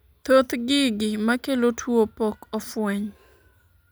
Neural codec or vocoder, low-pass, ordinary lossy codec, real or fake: none; none; none; real